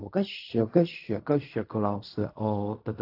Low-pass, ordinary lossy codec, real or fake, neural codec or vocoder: 5.4 kHz; AAC, 32 kbps; fake; codec, 16 kHz in and 24 kHz out, 0.4 kbps, LongCat-Audio-Codec, fine tuned four codebook decoder